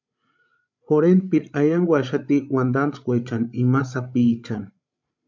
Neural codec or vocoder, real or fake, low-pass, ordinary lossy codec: codec, 16 kHz, 16 kbps, FreqCodec, larger model; fake; 7.2 kHz; MP3, 64 kbps